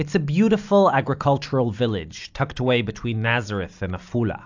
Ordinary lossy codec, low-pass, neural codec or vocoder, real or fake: AAC, 48 kbps; 7.2 kHz; none; real